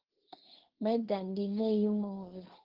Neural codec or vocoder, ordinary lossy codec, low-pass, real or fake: codec, 16 kHz, 1.1 kbps, Voila-Tokenizer; Opus, 16 kbps; 5.4 kHz; fake